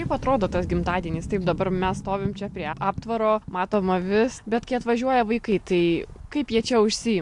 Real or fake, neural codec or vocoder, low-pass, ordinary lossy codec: real; none; 10.8 kHz; AAC, 64 kbps